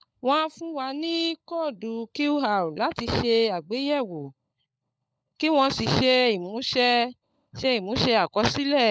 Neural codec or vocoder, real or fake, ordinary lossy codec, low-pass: codec, 16 kHz, 16 kbps, FunCodec, trained on LibriTTS, 50 frames a second; fake; none; none